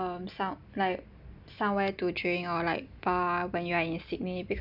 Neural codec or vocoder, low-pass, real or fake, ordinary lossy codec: none; 5.4 kHz; real; none